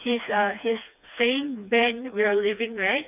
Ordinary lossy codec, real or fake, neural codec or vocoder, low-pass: none; fake; codec, 16 kHz, 2 kbps, FreqCodec, smaller model; 3.6 kHz